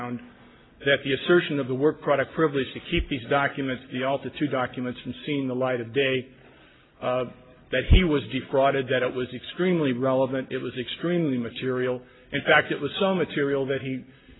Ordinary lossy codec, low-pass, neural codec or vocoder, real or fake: AAC, 16 kbps; 7.2 kHz; none; real